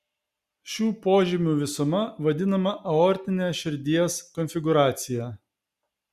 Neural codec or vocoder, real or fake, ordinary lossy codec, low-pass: none; real; Opus, 64 kbps; 14.4 kHz